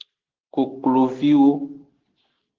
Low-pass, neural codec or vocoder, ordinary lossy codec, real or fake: 7.2 kHz; none; Opus, 16 kbps; real